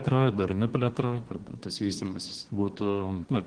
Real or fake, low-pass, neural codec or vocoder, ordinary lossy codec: fake; 9.9 kHz; codec, 24 kHz, 1 kbps, SNAC; Opus, 16 kbps